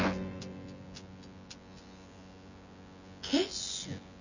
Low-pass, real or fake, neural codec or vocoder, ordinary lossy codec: 7.2 kHz; fake; vocoder, 24 kHz, 100 mel bands, Vocos; none